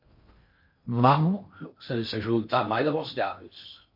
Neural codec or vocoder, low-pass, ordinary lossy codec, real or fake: codec, 16 kHz in and 24 kHz out, 0.6 kbps, FocalCodec, streaming, 4096 codes; 5.4 kHz; MP3, 32 kbps; fake